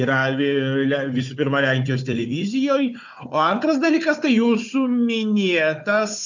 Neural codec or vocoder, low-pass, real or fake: codec, 16 kHz, 4 kbps, FunCodec, trained on Chinese and English, 50 frames a second; 7.2 kHz; fake